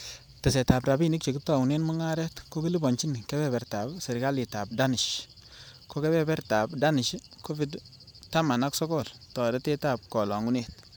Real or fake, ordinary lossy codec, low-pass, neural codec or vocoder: fake; none; none; vocoder, 44.1 kHz, 128 mel bands every 512 samples, BigVGAN v2